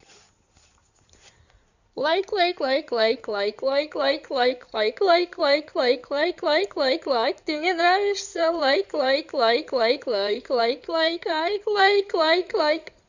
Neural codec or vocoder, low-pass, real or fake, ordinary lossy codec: codec, 16 kHz in and 24 kHz out, 2.2 kbps, FireRedTTS-2 codec; 7.2 kHz; fake; none